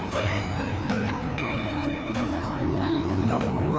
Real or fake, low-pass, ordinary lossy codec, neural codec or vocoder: fake; none; none; codec, 16 kHz, 2 kbps, FreqCodec, larger model